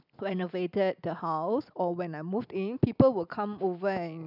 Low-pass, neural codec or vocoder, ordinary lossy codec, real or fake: 5.4 kHz; none; none; real